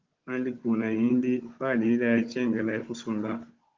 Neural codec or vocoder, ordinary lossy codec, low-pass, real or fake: codec, 16 kHz, 16 kbps, FunCodec, trained on Chinese and English, 50 frames a second; Opus, 32 kbps; 7.2 kHz; fake